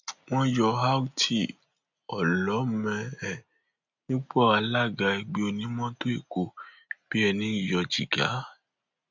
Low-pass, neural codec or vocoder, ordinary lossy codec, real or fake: 7.2 kHz; none; none; real